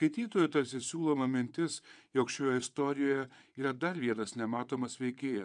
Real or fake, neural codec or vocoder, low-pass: fake; vocoder, 22.05 kHz, 80 mel bands, WaveNeXt; 9.9 kHz